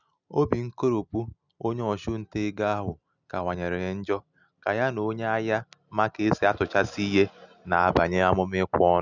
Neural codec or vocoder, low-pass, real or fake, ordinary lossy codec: none; 7.2 kHz; real; none